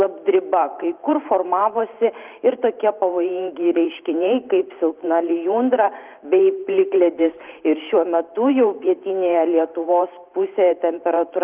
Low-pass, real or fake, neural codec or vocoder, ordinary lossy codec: 3.6 kHz; fake; vocoder, 44.1 kHz, 128 mel bands every 512 samples, BigVGAN v2; Opus, 24 kbps